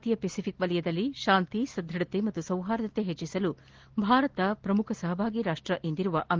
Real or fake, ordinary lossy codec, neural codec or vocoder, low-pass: real; Opus, 16 kbps; none; 7.2 kHz